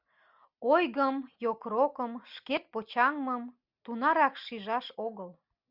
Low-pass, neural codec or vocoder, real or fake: 5.4 kHz; none; real